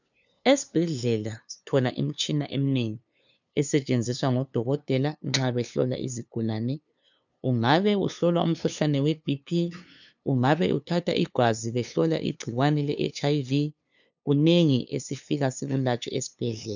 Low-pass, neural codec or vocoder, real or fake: 7.2 kHz; codec, 16 kHz, 2 kbps, FunCodec, trained on LibriTTS, 25 frames a second; fake